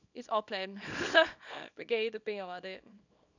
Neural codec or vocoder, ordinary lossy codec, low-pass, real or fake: codec, 24 kHz, 0.9 kbps, WavTokenizer, small release; none; 7.2 kHz; fake